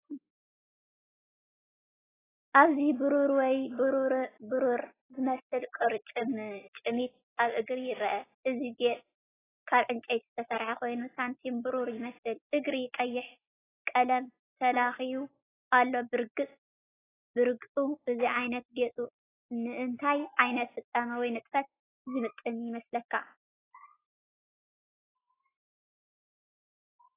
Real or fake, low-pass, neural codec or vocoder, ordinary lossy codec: real; 3.6 kHz; none; AAC, 16 kbps